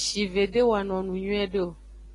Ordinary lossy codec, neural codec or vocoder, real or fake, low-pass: AAC, 32 kbps; none; real; 10.8 kHz